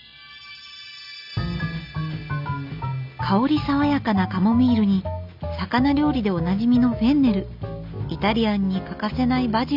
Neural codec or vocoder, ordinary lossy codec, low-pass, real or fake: none; none; 5.4 kHz; real